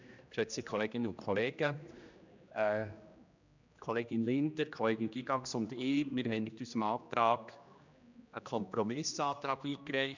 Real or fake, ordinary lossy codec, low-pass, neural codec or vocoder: fake; none; 7.2 kHz; codec, 16 kHz, 1 kbps, X-Codec, HuBERT features, trained on general audio